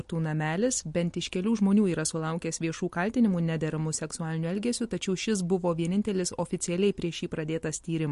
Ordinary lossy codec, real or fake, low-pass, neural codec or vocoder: MP3, 48 kbps; real; 14.4 kHz; none